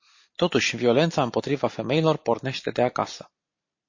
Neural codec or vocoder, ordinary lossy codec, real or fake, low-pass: none; MP3, 32 kbps; real; 7.2 kHz